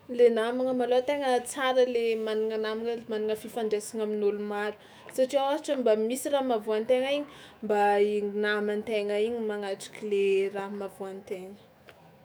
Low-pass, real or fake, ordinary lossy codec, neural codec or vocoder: none; fake; none; autoencoder, 48 kHz, 128 numbers a frame, DAC-VAE, trained on Japanese speech